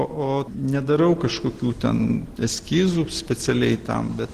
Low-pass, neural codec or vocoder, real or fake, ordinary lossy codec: 14.4 kHz; none; real; Opus, 16 kbps